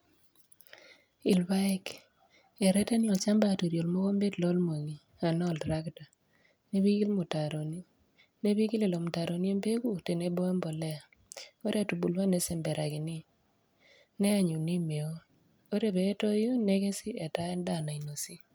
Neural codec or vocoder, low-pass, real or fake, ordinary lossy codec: none; none; real; none